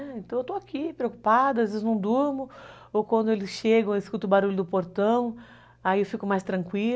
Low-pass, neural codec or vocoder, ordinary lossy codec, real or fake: none; none; none; real